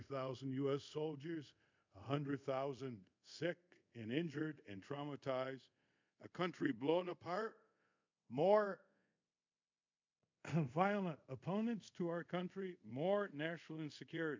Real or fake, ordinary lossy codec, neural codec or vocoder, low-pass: fake; MP3, 64 kbps; codec, 24 kHz, 0.5 kbps, DualCodec; 7.2 kHz